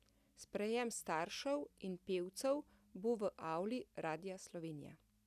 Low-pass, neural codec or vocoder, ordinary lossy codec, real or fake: 14.4 kHz; none; none; real